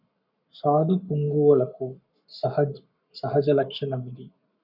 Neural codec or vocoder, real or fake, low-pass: codec, 44.1 kHz, 7.8 kbps, Pupu-Codec; fake; 5.4 kHz